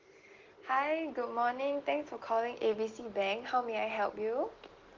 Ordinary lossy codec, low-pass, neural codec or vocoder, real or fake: Opus, 16 kbps; 7.2 kHz; none; real